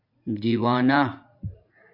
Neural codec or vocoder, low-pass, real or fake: vocoder, 44.1 kHz, 80 mel bands, Vocos; 5.4 kHz; fake